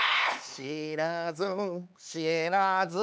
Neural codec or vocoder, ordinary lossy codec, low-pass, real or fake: codec, 16 kHz, 4 kbps, X-Codec, HuBERT features, trained on LibriSpeech; none; none; fake